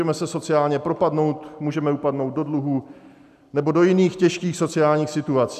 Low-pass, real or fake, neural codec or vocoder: 14.4 kHz; real; none